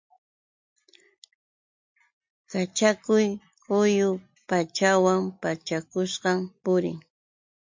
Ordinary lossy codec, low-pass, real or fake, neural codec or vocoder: MP3, 48 kbps; 7.2 kHz; real; none